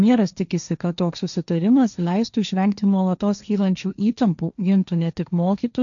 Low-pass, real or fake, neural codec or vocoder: 7.2 kHz; fake; codec, 16 kHz, 1.1 kbps, Voila-Tokenizer